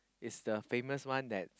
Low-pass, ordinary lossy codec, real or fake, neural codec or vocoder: none; none; real; none